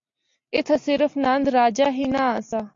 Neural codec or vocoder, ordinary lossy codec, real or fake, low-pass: none; MP3, 48 kbps; real; 7.2 kHz